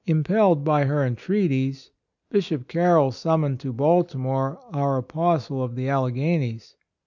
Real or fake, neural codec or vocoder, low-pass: real; none; 7.2 kHz